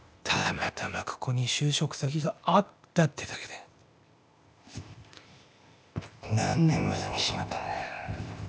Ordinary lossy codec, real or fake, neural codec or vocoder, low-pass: none; fake; codec, 16 kHz, 0.8 kbps, ZipCodec; none